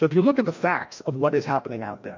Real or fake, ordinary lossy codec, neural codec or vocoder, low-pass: fake; MP3, 48 kbps; codec, 16 kHz, 1 kbps, FreqCodec, larger model; 7.2 kHz